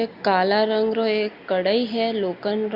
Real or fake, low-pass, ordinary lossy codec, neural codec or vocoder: real; 5.4 kHz; Opus, 64 kbps; none